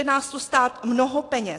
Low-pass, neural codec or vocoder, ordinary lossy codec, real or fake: 10.8 kHz; none; AAC, 48 kbps; real